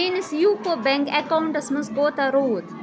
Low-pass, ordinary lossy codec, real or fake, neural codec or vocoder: none; none; real; none